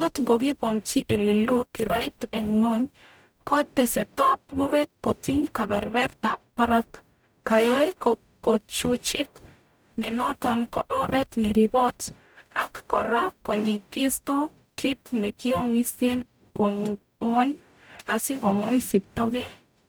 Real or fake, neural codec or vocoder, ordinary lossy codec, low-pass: fake; codec, 44.1 kHz, 0.9 kbps, DAC; none; none